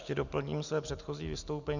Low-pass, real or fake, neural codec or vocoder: 7.2 kHz; real; none